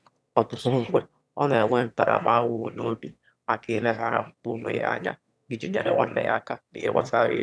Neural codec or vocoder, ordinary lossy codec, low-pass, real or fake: autoencoder, 22.05 kHz, a latent of 192 numbers a frame, VITS, trained on one speaker; none; none; fake